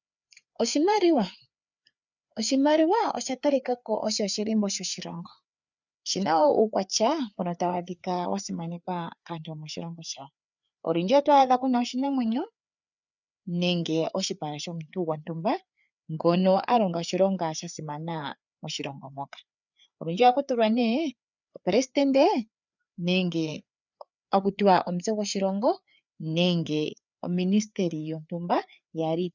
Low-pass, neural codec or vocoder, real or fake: 7.2 kHz; codec, 16 kHz, 4 kbps, FreqCodec, larger model; fake